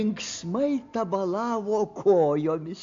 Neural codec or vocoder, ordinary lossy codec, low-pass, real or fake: none; MP3, 48 kbps; 7.2 kHz; real